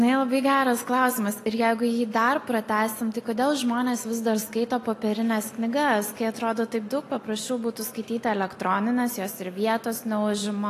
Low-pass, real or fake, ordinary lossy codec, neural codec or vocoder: 14.4 kHz; real; AAC, 48 kbps; none